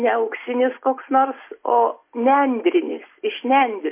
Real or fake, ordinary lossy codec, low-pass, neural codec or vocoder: real; MP3, 24 kbps; 3.6 kHz; none